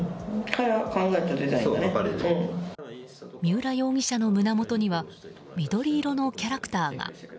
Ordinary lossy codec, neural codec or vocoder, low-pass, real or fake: none; none; none; real